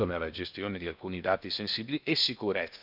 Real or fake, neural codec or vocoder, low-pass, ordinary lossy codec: fake; codec, 16 kHz in and 24 kHz out, 0.8 kbps, FocalCodec, streaming, 65536 codes; 5.4 kHz; none